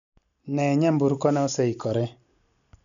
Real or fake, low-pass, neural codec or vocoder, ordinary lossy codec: real; 7.2 kHz; none; none